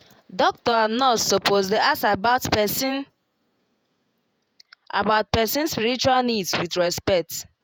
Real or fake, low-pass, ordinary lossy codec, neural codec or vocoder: fake; none; none; vocoder, 48 kHz, 128 mel bands, Vocos